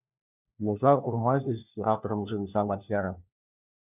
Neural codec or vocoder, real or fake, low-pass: codec, 16 kHz, 1 kbps, FunCodec, trained on LibriTTS, 50 frames a second; fake; 3.6 kHz